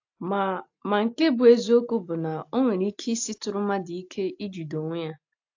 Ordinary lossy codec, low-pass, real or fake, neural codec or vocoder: AAC, 48 kbps; 7.2 kHz; real; none